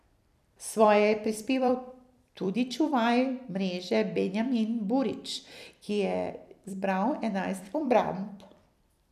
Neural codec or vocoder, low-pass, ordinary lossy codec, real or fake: vocoder, 44.1 kHz, 128 mel bands every 256 samples, BigVGAN v2; 14.4 kHz; none; fake